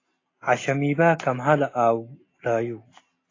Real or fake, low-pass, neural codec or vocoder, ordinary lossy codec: real; 7.2 kHz; none; AAC, 32 kbps